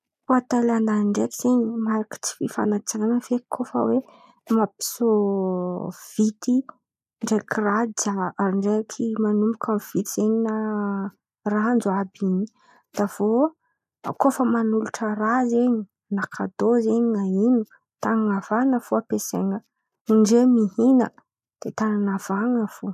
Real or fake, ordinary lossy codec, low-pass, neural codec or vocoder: real; none; 14.4 kHz; none